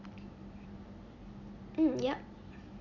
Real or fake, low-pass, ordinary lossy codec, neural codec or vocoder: real; 7.2 kHz; none; none